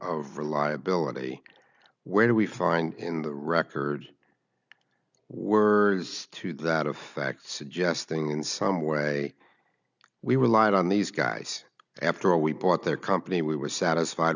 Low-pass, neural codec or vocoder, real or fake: 7.2 kHz; none; real